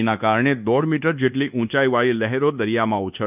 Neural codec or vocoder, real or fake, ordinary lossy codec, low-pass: codec, 24 kHz, 1.2 kbps, DualCodec; fake; none; 3.6 kHz